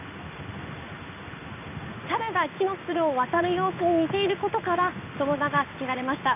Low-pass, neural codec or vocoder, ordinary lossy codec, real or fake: 3.6 kHz; codec, 16 kHz in and 24 kHz out, 1 kbps, XY-Tokenizer; none; fake